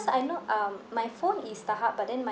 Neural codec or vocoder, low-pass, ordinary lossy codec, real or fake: none; none; none; real